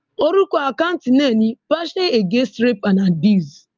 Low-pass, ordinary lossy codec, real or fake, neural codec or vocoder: 7.2 kHz; Opus, 24 kbps; real; none